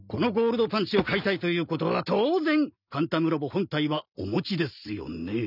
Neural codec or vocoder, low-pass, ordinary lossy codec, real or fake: none; 5.4 kHz; none; real